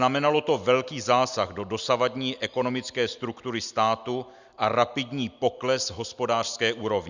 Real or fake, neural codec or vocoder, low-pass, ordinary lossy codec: real; none; 7.2 kHz; Opus, 64 kbps